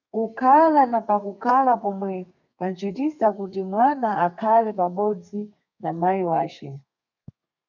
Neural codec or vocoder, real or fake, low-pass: codec, 32 kHz, 1.9 kbps, SNAC; fake; 7.2 kHz